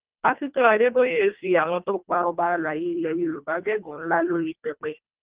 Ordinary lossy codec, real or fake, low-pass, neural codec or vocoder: Opus, 32 kbps; fake; 3.6 kHz; codec, 24 kHz, 1.5 kbps, HILCodec